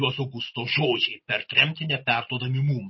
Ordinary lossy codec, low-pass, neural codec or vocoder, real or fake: MP3, 24 kbps; 7.2 kHz; none; real